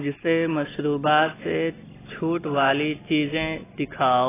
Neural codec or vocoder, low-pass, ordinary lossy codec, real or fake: none; 3.6 kHz; AAC, 16 kbps; real